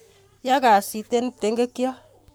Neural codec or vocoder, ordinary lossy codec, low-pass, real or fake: codec, 44.1 kHz, 7.8 kbps, Pupu-Codec; none; none; fake